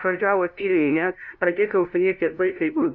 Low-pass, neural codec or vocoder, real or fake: 7.2 kHz; codec, 16 kHz, 0.5 kbps, FunCodec, trained on LibriTTS, 25 frames a second; fake